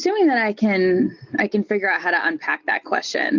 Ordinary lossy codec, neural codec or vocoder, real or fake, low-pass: Opus, 64 kbps; none; real; 7.2 kHz